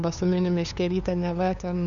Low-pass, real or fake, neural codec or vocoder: 7.2 kHz; fake; codec, 16 kHz, 2 kbps, FunCodec, trained on LibriTTS, 25 frames a second